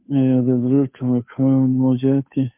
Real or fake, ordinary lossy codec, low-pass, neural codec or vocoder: fake; MP3, 32 kbps; 3.6 kHz; codec, 16 kHz, 2 kbps, FunCodec, trained on Chinese and English, 25 frames a second